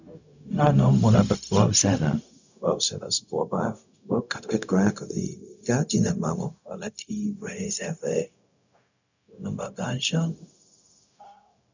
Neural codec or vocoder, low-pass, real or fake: codec, 16 kHz, 0.4 kbps, LongCat-Audio-Codec; 7.2 kHz; fake